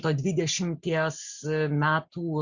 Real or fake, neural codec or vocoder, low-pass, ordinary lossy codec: real; none; 7.2 kHz; Opus, 64 kbps